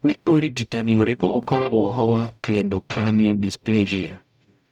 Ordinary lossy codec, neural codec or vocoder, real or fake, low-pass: none; codec, 44.1 kHz, 0.9 kbps, DAC; fake; 19.8 kHz